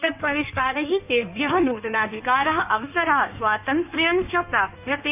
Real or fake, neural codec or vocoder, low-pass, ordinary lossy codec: fake; codec, 16 kHz in and 24 kHz out, 1.1 kbps, FireRedTTS-2 codec; 3.6 kHz; none